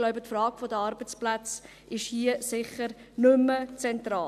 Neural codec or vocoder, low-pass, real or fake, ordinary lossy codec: none; 14.4 kHz; real; none